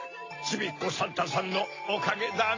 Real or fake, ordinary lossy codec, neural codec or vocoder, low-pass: real; AAC, 32 kbps; none; 7.2 kHz